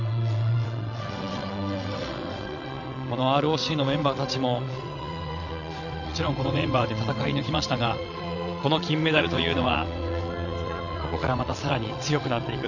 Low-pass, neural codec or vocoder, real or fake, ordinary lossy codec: 7.2 kHz; vocoder, 22.05 kHz, 80 mel bands, WaveNeXt; fake; none